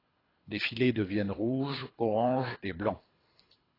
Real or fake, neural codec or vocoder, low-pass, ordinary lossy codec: fake; codec, 24 kHz, 3 kbps, HILCodec; 5.4 kHz; AAC, 24 kbps